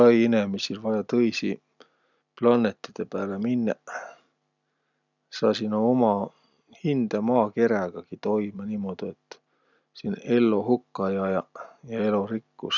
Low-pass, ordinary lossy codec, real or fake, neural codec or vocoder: 7.2 kHz; none; real; none